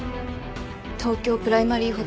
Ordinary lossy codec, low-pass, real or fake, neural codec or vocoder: none; none; real; none